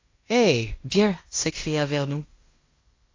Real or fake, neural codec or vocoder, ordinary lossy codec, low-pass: fake; codec, 16 kHz in and 24 kHz out, 0.9 kbps, LongCat-Audio-Codec, fine tuned four codebook decoder; AAC, 32 kbps; 7.2 kHz